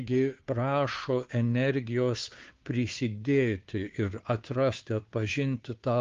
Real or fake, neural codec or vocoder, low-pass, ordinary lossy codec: fake; codec, 16 kHz, 2 kbps, FunCodec, trained on Chinese and English, 25 frames a second; 7.2 kHz; Opus, 32 kbps